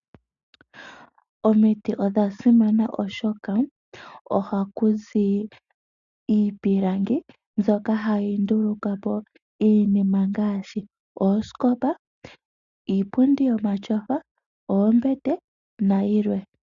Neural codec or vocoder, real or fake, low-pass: none; real; 7.2 kHz